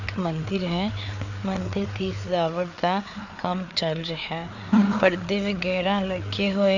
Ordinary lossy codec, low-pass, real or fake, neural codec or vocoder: none; 7.2 kHz; fake; codec, 16 kHz, 4 kbps, FreqCodec, larger model